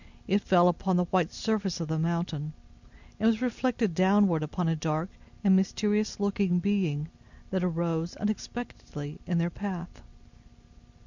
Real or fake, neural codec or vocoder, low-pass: real; none; 7.2 kHz